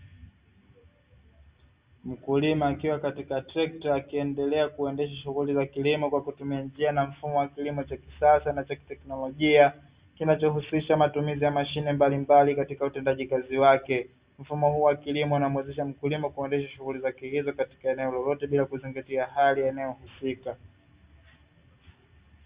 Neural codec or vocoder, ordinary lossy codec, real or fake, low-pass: none; Opus, 64 kbps; real; 3.6 kHz